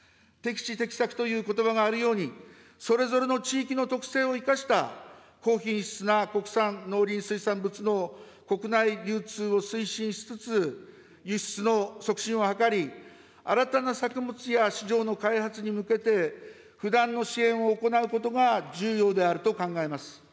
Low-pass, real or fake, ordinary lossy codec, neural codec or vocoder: none; real; none; none